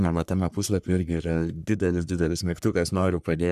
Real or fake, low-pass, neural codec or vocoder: fake; 14.4 kHz; codec, 44.1 kHz, 3.4 kbps, Pupu-Codec